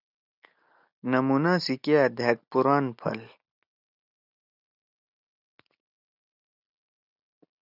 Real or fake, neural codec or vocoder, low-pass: real; none; 5.4 kHz